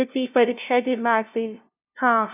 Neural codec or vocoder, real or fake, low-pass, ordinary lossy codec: codec, 16 kHz, 0.5 kbps, FunCodec, trained on LibriTTS, 25 frames a second; fake; 3.6 kHz; none